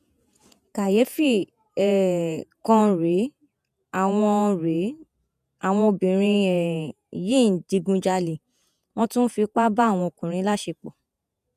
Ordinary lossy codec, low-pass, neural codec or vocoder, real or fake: none; 14.4 kHz; vocoder, 48 kHz, 128 mel bands, Vocos; fake